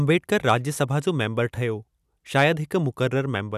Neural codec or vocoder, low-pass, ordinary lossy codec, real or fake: none; 14.4 kHz; none; real